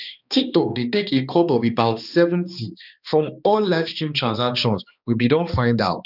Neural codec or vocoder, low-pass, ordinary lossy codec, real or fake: codec, 16 kHz, 2 kbps, X-Codec, HuBERT features, trained on balanced general audio; 5.4 kHz; none; fake